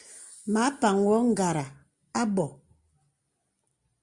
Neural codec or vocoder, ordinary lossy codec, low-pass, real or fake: none; Opus, 64 kbps; 10.8 kHz; real